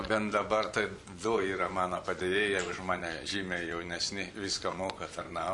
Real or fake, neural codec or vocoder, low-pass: fake; vocoder, 24 kHz, 100 mel bands, Vocos; 10.8 kHz